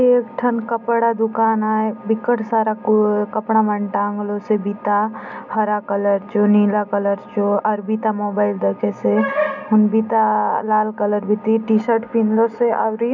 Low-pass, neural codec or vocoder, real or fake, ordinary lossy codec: 7.2 kHz; none; real; none